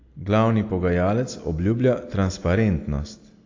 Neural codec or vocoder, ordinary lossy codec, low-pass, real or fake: none; AAC, 48 kbps; 7.2 kHz; real